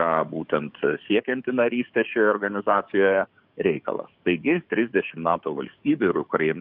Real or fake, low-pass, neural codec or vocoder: fake; 5.4 kHz; codec, 44.1 kHz, 7.8 kbps, DAC